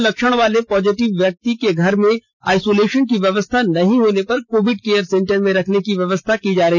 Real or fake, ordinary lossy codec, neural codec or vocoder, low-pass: real; none; none; none